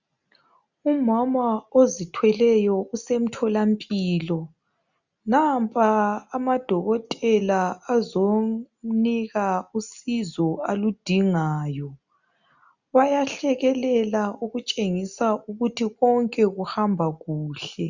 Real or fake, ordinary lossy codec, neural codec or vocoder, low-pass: real; Opus, 64 kbps; none; 7.2 kHz